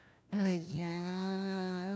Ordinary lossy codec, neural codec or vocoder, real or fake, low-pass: none; codec, 16 kHz, 1 kbps, FunCodec, trained on LibriTTS, 50 frames a second; fake; none